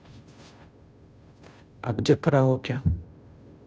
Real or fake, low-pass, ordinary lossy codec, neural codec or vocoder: fake; none; none; codec, 16 kHz, 0.5 kbps, FunCodec, trained on Chinese and English, 25 frames a second